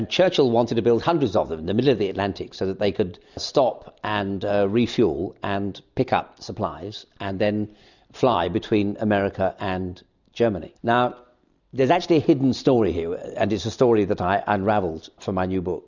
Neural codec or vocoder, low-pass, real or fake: none; 7.2 kHz; real